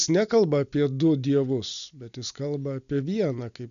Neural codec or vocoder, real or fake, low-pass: none; real; 7.2 kHz